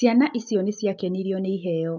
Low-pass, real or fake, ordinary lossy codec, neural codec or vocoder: 7.2 kHz; real; none; none